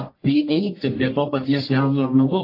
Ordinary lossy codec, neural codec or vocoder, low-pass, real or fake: AAC, 32 kbps; codec, 44.1 kHz, 1.7 kbps, Pupu-Codec; 5.4 kHz; fake